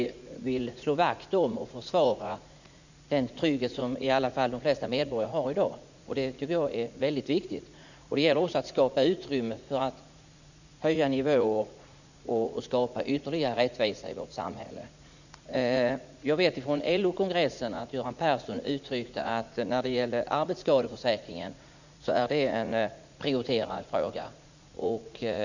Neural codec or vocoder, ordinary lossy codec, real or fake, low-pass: vocoder, 44.1 kHz, 80 mel bands, Vocos; none; fake; 7.2 kHz